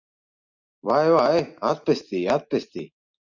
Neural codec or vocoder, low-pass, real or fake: none; 7.2 kHz; real